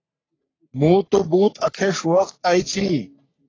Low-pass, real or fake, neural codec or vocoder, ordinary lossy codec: 7.2 kHz; fake; codec, 44.1 kHz, 3.4 kbps, Pupu-Codec; AAC, 32 kbps